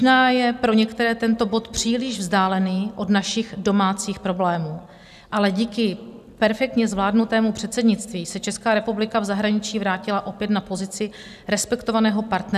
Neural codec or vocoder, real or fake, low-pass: none; real; 14.4 kHz